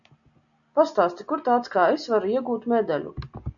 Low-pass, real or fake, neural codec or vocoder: 7.2 kHz; real; none